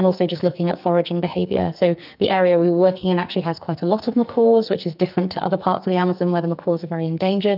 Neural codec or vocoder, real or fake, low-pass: codec, 44.1 kHz, 2.6 kbps, SNAC; fake; 5.4 kHz